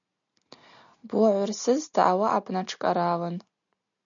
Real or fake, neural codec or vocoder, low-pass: real; none; 7.2 kHz